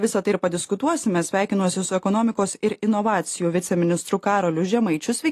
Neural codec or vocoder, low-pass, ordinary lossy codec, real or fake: none; 14.4 kHz; AAC, 48 kbps; real